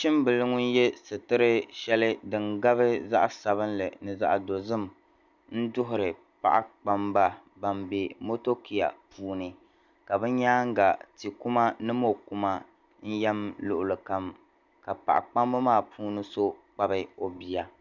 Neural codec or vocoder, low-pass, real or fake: none; 7.2 kHz; real